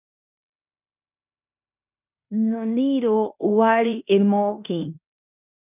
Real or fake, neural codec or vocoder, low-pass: fake; codec, 16 kHz in and 24 kHz out, 0.9 kbps, LongCat-Audio-Codec, fine tuned four codebook decoder; 3.6 kHz